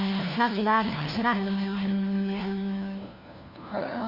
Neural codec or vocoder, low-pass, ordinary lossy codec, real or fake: codec, 16 kHz, 1 kbps, FunCodec, trained on LibriTTS, 50 frames a second; 5.4 kHz; Opus, 64 kbps; fake